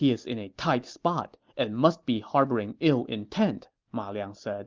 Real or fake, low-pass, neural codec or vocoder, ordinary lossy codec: real; 7.2 kHz; none; Opus, 32 kbps